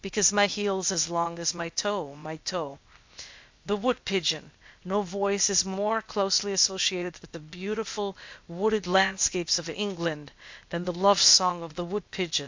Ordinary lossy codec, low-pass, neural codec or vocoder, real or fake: MP3, 64 kbps; 7.2 kHz; codec, 16 kHz, 0.8 kbps, ZipCodec; fake